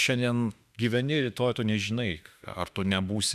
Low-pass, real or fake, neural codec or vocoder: 14.4 kHz; fake; autoencoder, 48 kHz, 32 numbers a frame, DAC-VAE, trained on Japanese speech